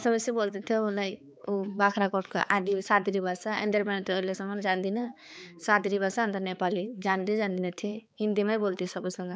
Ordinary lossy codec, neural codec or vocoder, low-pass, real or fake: none; codec, 16 kHz, 4 kbps, X-Codec, HuBERT features, trained on balanced general audio; none; fake